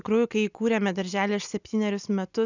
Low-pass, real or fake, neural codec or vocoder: 7.2 kHz; real; none